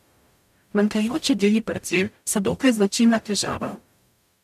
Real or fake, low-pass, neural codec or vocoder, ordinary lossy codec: fake; 14.4 kHz; codec, 44.1 kHz, 0.9 kbps, DAC; none